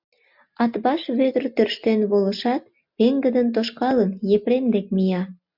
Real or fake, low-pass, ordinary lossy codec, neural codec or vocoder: real; 5.4 kHz; MP3, 48 kbps; none